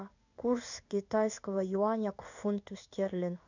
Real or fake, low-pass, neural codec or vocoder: fake; 7.2 kHz; codec, 16 kHz in and 24 kHz out, 1 kbps, XY-Tokenizer